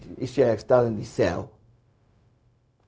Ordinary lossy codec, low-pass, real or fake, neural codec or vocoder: none; none; fake; codec, 16 kHz, 0.4 kbps, LongCat-Audio-Codec